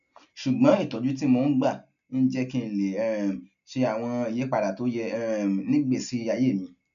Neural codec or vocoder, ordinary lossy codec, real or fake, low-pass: none; MP3, 96 kbps; real; 7.2 kHz